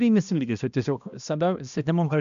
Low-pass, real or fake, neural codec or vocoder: 7.2 kHz; fake; codec, 16 kHz, 1 kbps, X-Codec, HuBERT features, trained on balanced general audio